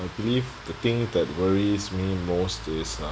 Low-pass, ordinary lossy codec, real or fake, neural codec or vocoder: none; none; real; none